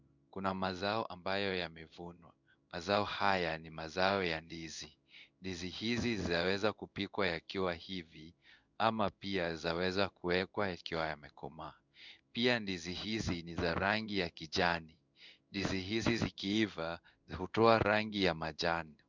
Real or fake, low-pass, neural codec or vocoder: fake; 7.2 kHz; codec, 16 kHz in and 24 kHz out, 1 kbps, XY-Tokenizer